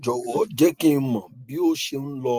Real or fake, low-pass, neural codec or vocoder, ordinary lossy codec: real; 14.4 kHz; none; Opus, 32 kbps